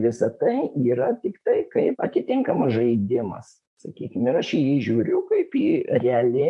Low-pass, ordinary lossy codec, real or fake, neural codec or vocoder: 10.8 kHz; MP3, 64 kbps; fake; vocoder, 44.1 kHz, 128 mel bands, Pupu-Vocoder